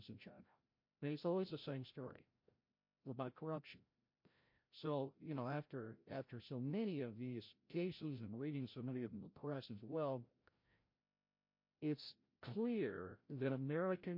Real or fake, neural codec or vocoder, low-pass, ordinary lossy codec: fake; codec, 16 kHz, 0.5 kbps, FreqCodec, larger model; 5.4 kHz; MP3, 32 kbps